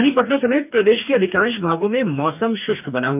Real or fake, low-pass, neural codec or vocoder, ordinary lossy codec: fake; 3.6 kHz; codec, 44.1 kHz, 2.6 kbps, DAC; none